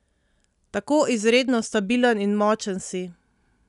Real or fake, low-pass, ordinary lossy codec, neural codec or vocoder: real; 10.8 kHz; none; none